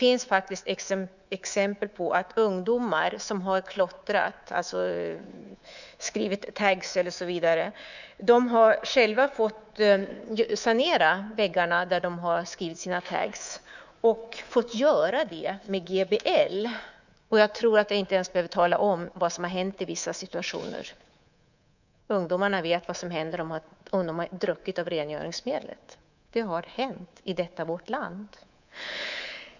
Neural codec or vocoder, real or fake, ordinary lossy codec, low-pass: codec, 24 kHz, 3.1 kbps, DualCodec; fake; none; 7.2 kHz